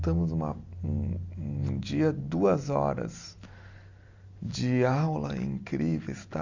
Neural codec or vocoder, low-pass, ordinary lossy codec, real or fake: none; 7.2 kHz; Opus, 64 kbps; real